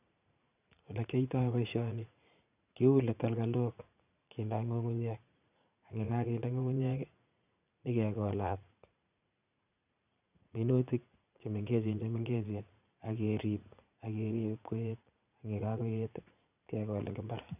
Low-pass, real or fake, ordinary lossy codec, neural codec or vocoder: 3.6 kHz; fake; none; vocoder, 44.1 kHz, 80 mel bands, Vocos